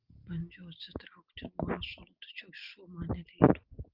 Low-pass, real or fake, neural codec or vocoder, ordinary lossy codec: 5.4 kHz; real; none; Opus, 24 kbps